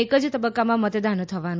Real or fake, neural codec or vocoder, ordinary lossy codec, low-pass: real; none; none; none